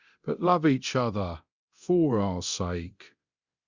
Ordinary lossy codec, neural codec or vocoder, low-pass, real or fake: Opus, 64 kbps; codec, 24 kHz, 0.9 kbps, DualCodec; 7.2 kHz; fake